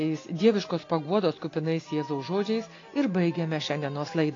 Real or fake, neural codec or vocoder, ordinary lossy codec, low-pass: real; none; AAC, 32 kbps; 7.2 kHz